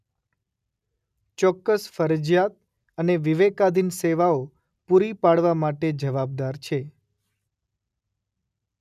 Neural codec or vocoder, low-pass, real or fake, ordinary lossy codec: none; 14.4 kHz; real; none